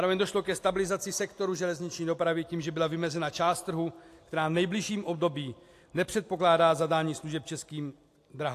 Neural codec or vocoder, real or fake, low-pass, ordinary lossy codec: none; real; 14.4 kHz; AAC, 64 kbps